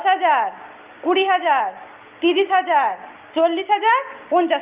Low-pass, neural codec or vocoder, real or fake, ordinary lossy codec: 3.6 kHz; none; real; Opus, 32 kbps